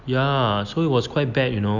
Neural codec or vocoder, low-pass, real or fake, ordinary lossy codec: none; 7.2 kHz; real; none